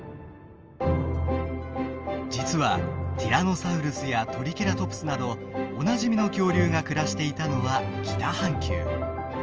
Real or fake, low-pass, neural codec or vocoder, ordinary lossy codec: real; 7.2 kHz; none; Opus, 24 kbps